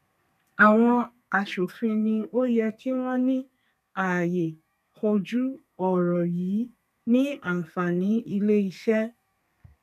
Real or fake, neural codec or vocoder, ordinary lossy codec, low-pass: fake; codec, 32 kHz, 1.9 kbps, SNAC; none; 14.4 kHz